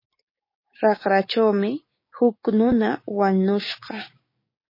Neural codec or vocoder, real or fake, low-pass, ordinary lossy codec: codec, 16 kHz, 6 kbps, DAC; fake; 5.4 kHz; MP3, 24 kbps